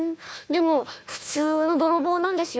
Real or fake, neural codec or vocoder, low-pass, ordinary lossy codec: fake; codec, 16 kHz, 1 kbps, FunCodec, trained on Chinese and English, 50 frames a second; none; none